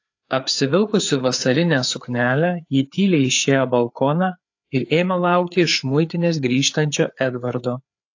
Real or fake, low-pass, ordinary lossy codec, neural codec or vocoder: fake; 7.2 kHz; AAC, 48 kbps; codec, 16 kHz, 4 kbps, FreqCodec, larger model